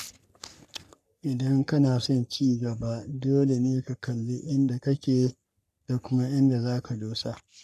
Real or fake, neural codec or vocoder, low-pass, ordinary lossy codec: fake; codec, 44.1 kHz, 3.4 kbps, Pupu-Codec; 14.4 kHz; none